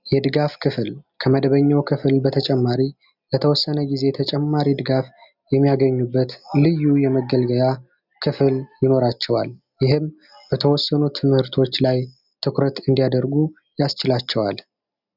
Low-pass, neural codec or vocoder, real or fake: 5.4 kHz; none; real